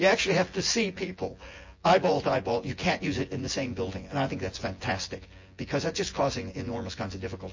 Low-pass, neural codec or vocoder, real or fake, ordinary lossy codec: 7.2 kHz; vocoder, 24 kHz, 100 mel bands, Vocos; fake; MP3, 32 kbps